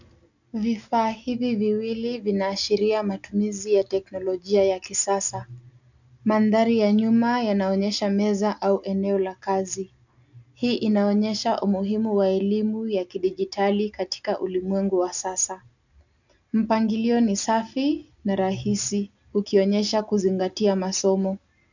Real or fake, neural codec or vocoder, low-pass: real; none; 7.2 kHz